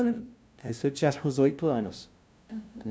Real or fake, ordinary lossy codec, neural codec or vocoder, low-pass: fake; none; codec, 16 kHz, 0.5 kbps, FunCodec, trained on LibriTTS, 25 frames a second; none